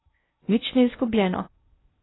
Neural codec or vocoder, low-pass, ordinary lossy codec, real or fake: codec, 16 kHz in and 24 kHz out, 0.6 kbps, FocalCodec, streaming, 2048 codes; 7.2 kHz; AAC, 16 kbps; fake